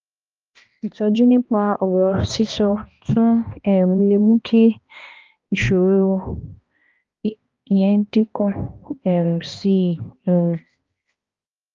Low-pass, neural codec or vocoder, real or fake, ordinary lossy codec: 7.2 kHz; codec, 16 kHz, 1 kbps, X-Codec, HuBERT features, trained on balanced general audio; fake; Opus, 32 kbps